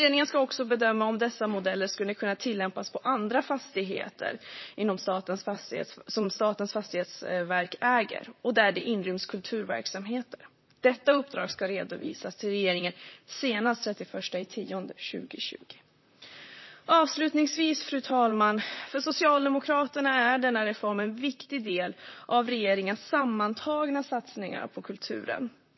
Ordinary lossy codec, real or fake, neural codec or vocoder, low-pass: MP3, 24 kbps; fake; vocoder, 44.1 kHz, 128 mel bands, Pupu-Vocoder; 7.2 kHz